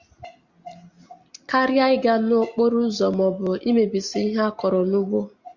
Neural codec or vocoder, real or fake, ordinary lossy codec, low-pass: none; real; Opus, 64 kbps; 7.2 kHz